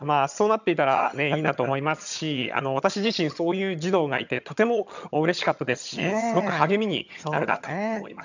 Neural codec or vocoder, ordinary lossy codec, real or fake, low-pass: vocoder, 22.05 kHz, 80 mel bands, HiFi-GAN; none; fake; 7.2 kHz